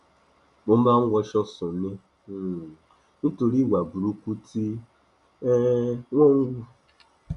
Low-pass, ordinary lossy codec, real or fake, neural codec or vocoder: 10.8 kHz; none; real; none